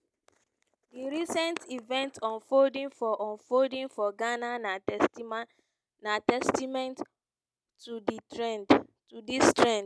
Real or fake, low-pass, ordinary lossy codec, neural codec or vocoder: real; none; none; none